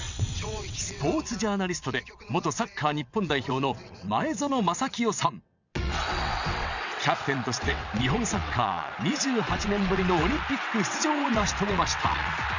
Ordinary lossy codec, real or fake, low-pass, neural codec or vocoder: none; fake; 7.2 kHz; vocoder, 22.05 kHz, 80 mel bands, WaveNeXt